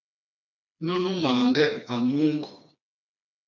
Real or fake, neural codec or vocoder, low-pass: fake; codec, 16 kHz, 2 kbps, FreqCodec, smaller model; 7.2 kHz